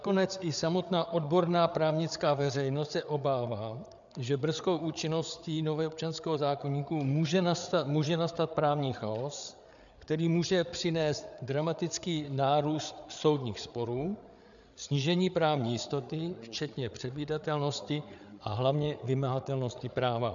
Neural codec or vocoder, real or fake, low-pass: codec, 16 kHz, 8 kbps, FreqCodec, larger model; fake; 7.2 kHz